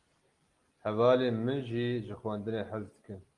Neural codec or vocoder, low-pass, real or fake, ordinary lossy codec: none; 10.8 kHz; real; Opus, 24 kbps